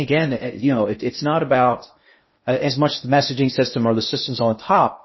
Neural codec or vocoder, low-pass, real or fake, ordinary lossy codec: codec, 16 kHz in and 24 kHz out, 0.6 kbps, FocalCodec, streaming, 2048 codes; 7.2 kHz; fake; MP3, 24 kbps